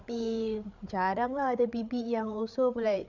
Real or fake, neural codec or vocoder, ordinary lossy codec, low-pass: fake; codec, 16 kHz, 8 kbps, FreqCodec, larger model; none; 7.2 kHz